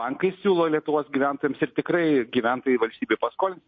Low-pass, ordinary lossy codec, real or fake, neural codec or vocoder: 7.2 kHz; MP3, 32 kbps; real; none